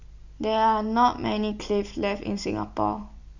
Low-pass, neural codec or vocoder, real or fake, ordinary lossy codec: 7.2 kHz; none; real; none